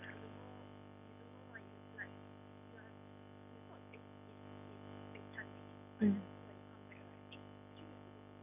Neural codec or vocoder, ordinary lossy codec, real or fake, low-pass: none; none; real; 3.6 kHz